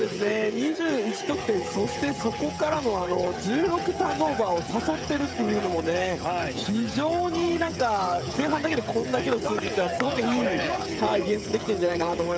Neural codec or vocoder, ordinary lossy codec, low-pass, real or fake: codec, 16 kHz, 16 kbps, FreqCodec, smaller model; none; none; fake